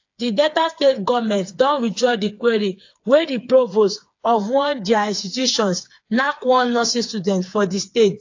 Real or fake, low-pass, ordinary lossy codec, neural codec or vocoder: fake; 7.2 kHz; AAC, 48 kbps; codec, 16 kHz, 4 kbps, FreqCodec, smaller model